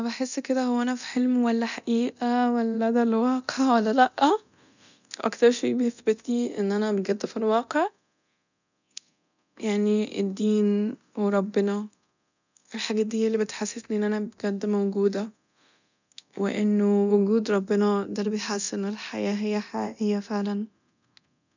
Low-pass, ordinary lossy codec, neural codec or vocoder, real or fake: 7.2 kHz; none; codec, 24 kHz, 0.9 kbps, DualCodec; fake